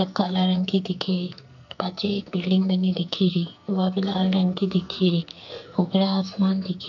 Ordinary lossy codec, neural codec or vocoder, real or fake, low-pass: none; codec, 44.1 kHz, 2.6 kbps, SNAC; fake; 7.2 kHz